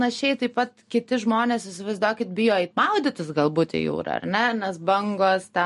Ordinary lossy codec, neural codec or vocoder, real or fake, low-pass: MP3, 48 kbps; none; real; 14.4 kHz